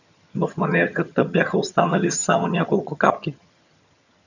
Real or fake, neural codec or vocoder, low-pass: fake; vocoder, 22.05 kHz, 80 mel bands, HiFi-GAN; 7.2 kHz